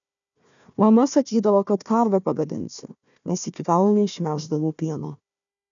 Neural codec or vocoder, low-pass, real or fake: codec, 16 kHz, 1 kbps, FunCodec, trained on Chinese and English, 50 frames a second; 7.2 kHz; fake